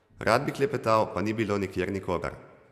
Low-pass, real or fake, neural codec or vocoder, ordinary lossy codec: 14.4 kHz; fake; vocoder, 44.1 kHz, 128 mel bands every 512 samples, BigVGAN v2; none